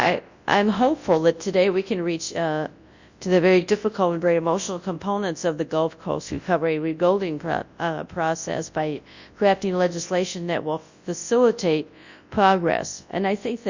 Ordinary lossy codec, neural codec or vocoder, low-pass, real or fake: Opus, 64 kbps; codec, 24 kHz, 0.9 kbps, WavTokenizer, large speech release; 7.2 kHz; fake